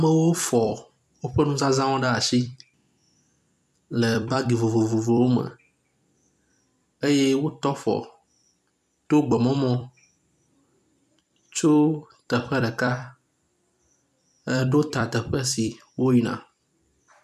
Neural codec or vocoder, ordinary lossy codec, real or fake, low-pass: vocoder, 44.1 kHz, 128 mel bands every 256 samples, BigVGAN v2; MP3, 96 kbps; fake; 14.4 kHz